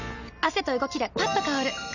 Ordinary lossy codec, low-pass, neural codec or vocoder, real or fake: none; 7.2 kHz; none; real